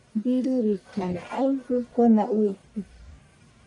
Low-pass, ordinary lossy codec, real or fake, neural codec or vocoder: 10.8 kHz; AAC, 64 kbps; fake; codec, 44.1 kHz, 1.7 kbps, Pupu-Codec